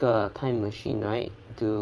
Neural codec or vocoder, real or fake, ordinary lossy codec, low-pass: vocoder, 22.05 kHz, 80 mel bands, WaveNeXt; fake; none; none